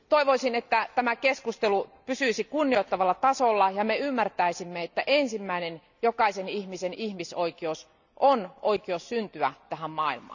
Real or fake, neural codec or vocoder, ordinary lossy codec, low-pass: real; none; none; 7.2 kHz